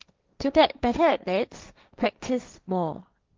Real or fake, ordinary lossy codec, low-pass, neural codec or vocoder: fake; Opus, 24 kbps; 7.2 kHz; codec, 16 kHz, 1.1 kbps, Voila-Tokenizer